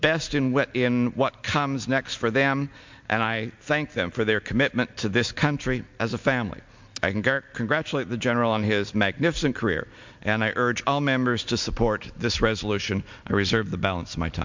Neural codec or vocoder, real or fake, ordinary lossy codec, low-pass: none; real; MP3, 64 kbps; 7.2 kHz